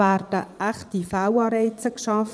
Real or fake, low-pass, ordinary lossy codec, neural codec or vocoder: fake; none; none; vocoder, 22.05 kHz, 80 mel bands, Vocos